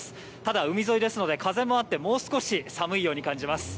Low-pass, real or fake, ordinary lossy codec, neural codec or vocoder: none; real; none; none